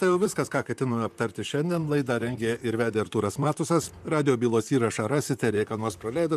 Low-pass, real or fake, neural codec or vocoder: 14.4 kHz; fake; vocoder, 44.1 kHz, 128 mel bands, Pupu-Vocoder